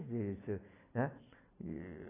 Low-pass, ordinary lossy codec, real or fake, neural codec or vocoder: 3.6 kHz; AAC, 16 kbps; real; none